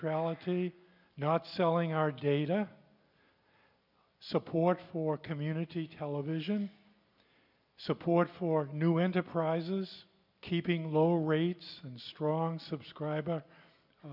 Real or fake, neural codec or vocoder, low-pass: real; none; 5.4 kHz